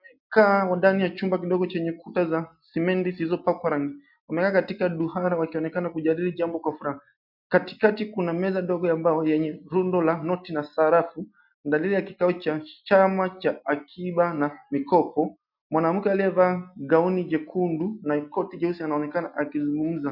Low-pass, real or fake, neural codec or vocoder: 5.4 kHz; real; none